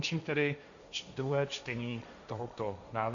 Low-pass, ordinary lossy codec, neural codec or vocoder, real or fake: 7.2 kHz; Opus, 64 kbps; codec, 16 kHz, 1.1 kbps, Voila-Tokenizer; fake